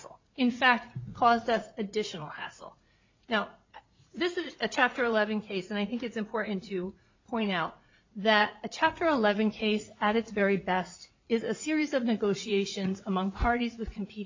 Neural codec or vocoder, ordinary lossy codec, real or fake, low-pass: vocoder, 44.1 kHz, 80 mel bands, Vocos; MP3, 64 kbps; fake; 7.2 kHz